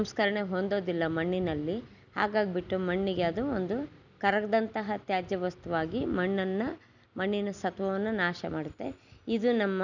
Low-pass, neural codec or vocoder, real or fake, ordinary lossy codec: 7.2 kHz; none; real; none